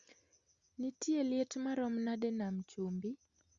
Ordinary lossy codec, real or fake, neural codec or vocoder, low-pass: Opus, 64 kbps; real; none; 7.2 kHz